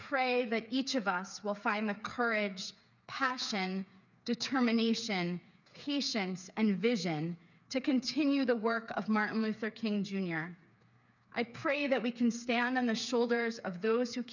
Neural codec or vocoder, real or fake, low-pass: codec, 16 kHz, 8 kbps, FreqCodec, smaller model; fake; 7.2 kHz